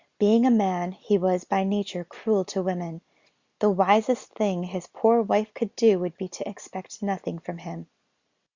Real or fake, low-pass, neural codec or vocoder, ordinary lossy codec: real; 7.2 kHz; none; Opus, 64 kbps